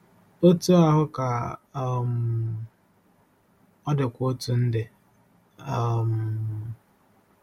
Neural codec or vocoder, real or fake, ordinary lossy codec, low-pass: none; real; MP3, 64 kbps; 19.8 kHz